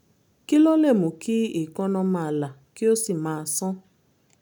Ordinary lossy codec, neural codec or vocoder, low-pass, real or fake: none; none; none; real